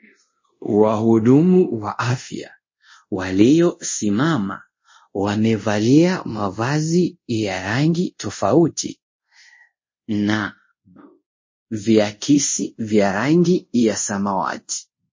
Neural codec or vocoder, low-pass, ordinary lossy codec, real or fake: codec, 24 kHz, 0.5 kbps, DualCodec; 7.2 kHz; MP3, 32 kbps; fake